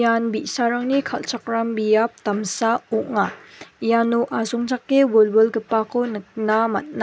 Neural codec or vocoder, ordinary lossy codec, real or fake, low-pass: none; none; real; none